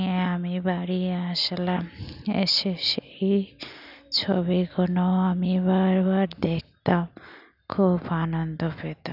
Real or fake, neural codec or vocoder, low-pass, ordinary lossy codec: real; none; 5.4 kHz; none